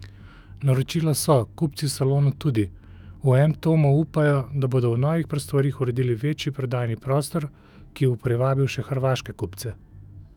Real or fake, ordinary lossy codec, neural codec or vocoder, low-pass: fake; none; autoencoder, 48 kHz, 128 numbers a frame, DAC-VAE, trained on Japanese speech; 19.8 kHz